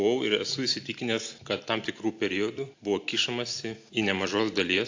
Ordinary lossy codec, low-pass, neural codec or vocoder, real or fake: AAC, 48 kbps; 7.2 kHz; none; real